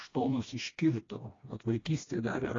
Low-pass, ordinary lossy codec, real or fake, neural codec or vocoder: 7.2 kHz; AAC, 32 kbps; fake; codec, 16 kHz, 1 kbps, FreqCodec, smaller model